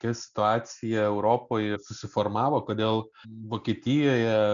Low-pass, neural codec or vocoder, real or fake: 7.2 kHz; none; real